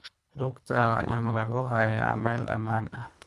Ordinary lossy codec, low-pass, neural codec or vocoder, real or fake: none; none; codec, 24 kHz, 1.5 kbps, HILCodec; fake